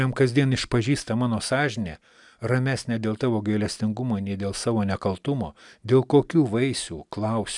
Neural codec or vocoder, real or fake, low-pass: none; real; 10.8 kHz